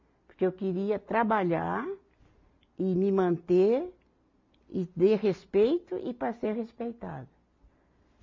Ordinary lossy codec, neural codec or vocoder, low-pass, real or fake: MP3, 48 kbps; none; 7.2 kHz; real